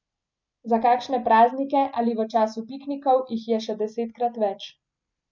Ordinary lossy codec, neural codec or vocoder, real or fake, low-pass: MP3, 64 kbps; none; real; 7.2 kHz